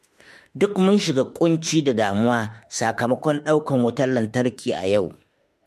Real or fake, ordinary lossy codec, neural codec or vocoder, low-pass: fake; MP3, 64 kbps; autoencoder, 48 kHz, 32 numbers a frame, DAC-VAE, trained on Japanese speech; 14.4 kHz